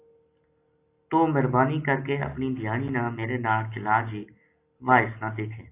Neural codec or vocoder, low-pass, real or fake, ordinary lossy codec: none; 3.6 kHz; real; AAC, 24 kbps